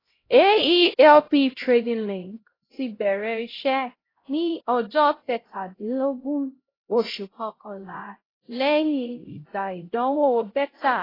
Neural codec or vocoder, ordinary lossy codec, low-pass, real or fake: codec, 16 kHz, 0.5 kbps, X-Codec, HuBERT features, trained on LibriSpeech; AAC, 24 kbps; 5.4 kHz; fake